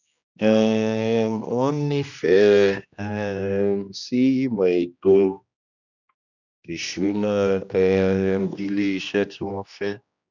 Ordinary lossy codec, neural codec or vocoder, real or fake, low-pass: none; codec, 16 kHz, 1 kbps, X-Codec, HuBERT features, trained on general audio; fake; 7.2 kHz